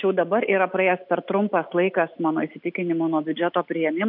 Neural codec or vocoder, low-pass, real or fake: none; 5.4 kHz; real